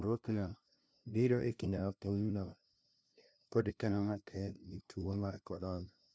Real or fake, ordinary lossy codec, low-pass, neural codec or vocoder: fake; none; none; codec, 16 kHz, 0.5 kbps, FunCodec, trained on LibriTTS, 25 frames a second